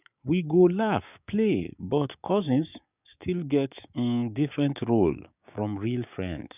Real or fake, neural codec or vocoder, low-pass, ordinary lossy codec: fake; codec, 16 kHz, 6 kbps, DAC; 3.6 kHz; none